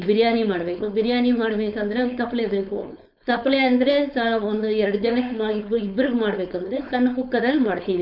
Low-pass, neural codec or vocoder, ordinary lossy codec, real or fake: 5.4 kHz; codec, 16 kHz, 4.8 kbps, FACodec; none; fake